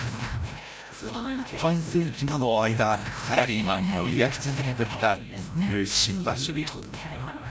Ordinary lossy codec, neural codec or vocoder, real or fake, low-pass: none; codec, 16 kHz, 0.5 kbps, FreqCodec, larger model; fake; none